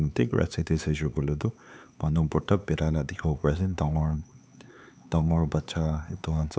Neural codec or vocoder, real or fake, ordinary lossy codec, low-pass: codec, 16 kHz, 4 kbps, X-Codec, HuBERT features, trained on LibriSpeech; fake; none; none